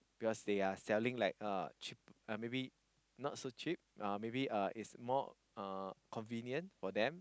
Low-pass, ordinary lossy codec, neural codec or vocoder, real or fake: none; none; none; real